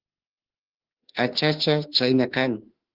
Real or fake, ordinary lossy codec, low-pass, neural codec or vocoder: fake; Opus, 16 kbps; 5.4 kHz; autoencoder, 48 kHz, 32 numbers a frame, DAC-VAE, trained on Japanese speech